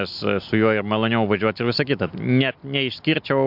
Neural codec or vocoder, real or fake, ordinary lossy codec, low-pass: none; real; MP3, 48 kbps; 5.4 kHz